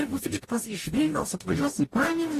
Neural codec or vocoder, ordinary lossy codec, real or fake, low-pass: codec, 44.1 kHz, 0.9 kbps, DAC; AAC, 48 kbps; fake; 14.4 kHz